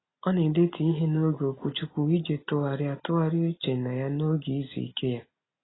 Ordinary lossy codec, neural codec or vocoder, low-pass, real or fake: AAC, 16 kbps; none; 7.2 kHz; real